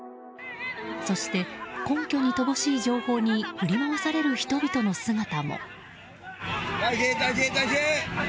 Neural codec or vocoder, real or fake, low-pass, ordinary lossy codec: none; real; none; none